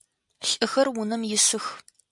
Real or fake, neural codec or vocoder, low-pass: real; none; 10.8 kHz